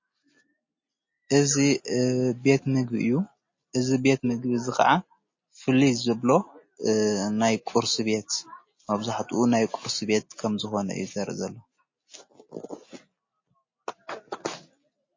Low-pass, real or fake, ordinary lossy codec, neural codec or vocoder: 7.2 kHz; real; MP3, 32 kbps; none